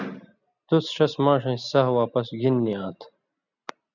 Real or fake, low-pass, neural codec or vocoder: real; 7.2 kHz; none